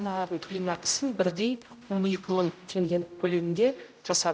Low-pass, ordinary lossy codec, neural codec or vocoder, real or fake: none; none; codec, 16 kHz, 0.5 kbps, X-Codec, HuBERT features, trained on general audio; fake